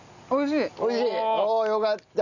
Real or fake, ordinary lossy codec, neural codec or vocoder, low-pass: real; none; none; 7.2 kHz